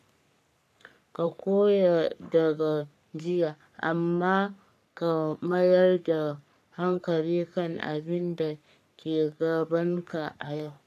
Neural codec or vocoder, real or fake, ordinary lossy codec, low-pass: codec, 44.1 kHz, 3.4 kbps, Pupu-Codec; fake; none; 14.4 kHz